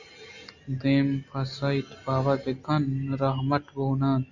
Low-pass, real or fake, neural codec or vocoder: 7.2 kHz; real; none